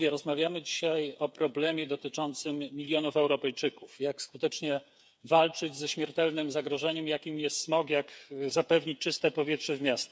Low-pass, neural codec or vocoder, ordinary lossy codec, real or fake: none; codec, 16 kHz, 8 kbps, FreqCodec, smaller model; none; fake